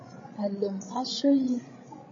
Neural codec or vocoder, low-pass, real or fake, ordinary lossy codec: codec, 16 kHz, 16 kbps, FreqCodec, larger model; 7.2 kHz; fake; MP3, 32 kbps